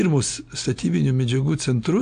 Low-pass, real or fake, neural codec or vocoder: 9.9 kHz; real; none